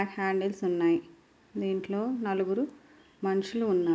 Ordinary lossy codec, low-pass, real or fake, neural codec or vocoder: none; none; real; none